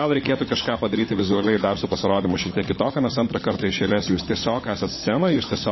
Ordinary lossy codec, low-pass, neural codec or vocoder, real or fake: MP3, 24 kbps; 7.2 kHz; codec, 16 kHz, 16 kbps, FunCodec, trained on LibriTTS, 50 frames a second; fake